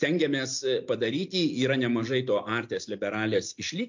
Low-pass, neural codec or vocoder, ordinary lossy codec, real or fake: 7.2 kHz; none; MP3, 48 kbps; real